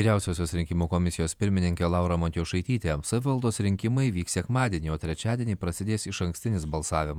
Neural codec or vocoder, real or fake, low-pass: vocoder, 48 kHz, 128 mel bands, Vocos; fake; 19.8 kHz